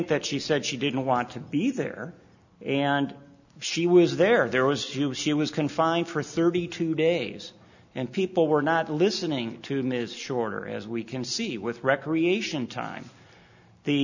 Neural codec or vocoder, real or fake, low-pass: none; real; 7.2 kHz